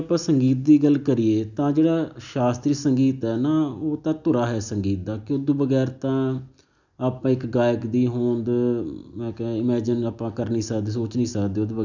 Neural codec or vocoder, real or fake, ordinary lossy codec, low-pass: none; real; none; 7.2 kHz